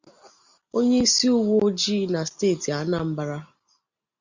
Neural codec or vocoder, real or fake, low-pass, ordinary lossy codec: none; real; 7.2 kHz; Opus, 64 kbps